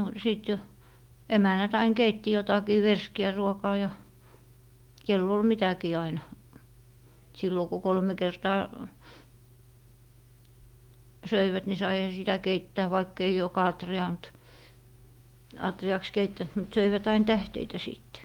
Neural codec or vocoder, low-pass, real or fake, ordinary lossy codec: autoencoder, 48 kHz, 128 numbers a frame, DAC-VAE, trained on Japanese speech; 19.8 kHz; fake; Opus, 24 kbps